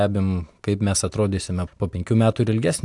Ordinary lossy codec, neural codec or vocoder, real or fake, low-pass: AAC, 64 kbps; none; real; 10.8 kHz